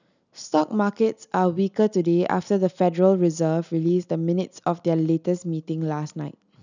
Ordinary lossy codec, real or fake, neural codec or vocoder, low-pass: none; real; none; 7.2 kHz